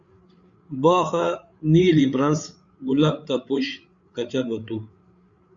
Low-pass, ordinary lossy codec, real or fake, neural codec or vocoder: 7.2 kHz; Opus, 64 kbps; fake; codec, 16 kHz, 8 kbps, FreqCodec, larger model